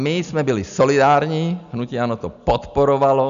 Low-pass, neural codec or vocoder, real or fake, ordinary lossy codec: 7.2 kHz; none; real; MP3, 96 kbps